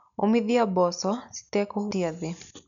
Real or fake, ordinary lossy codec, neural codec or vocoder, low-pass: real; none; none; 7.2 kHz